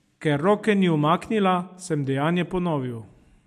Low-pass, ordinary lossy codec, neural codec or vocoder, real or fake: 14.4 kHz; MP3, 64 kbps; none; real